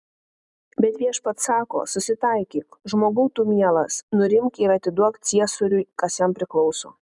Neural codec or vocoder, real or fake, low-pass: none; real; 10.8 kHz